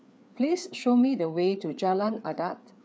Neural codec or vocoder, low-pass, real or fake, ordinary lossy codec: codec, 16 kHz, 4 kbps, FreqCodec, larger model; none; fake; none